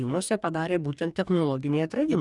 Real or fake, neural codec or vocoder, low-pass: fake; codec, 44.1 kHz, 2.6 kbps, DAC; 10.8 kHz